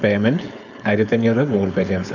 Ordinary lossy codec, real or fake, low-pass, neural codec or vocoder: none; fake; 7.2 kHz; codec, 16 kHz, 4.8 kbps, FACodec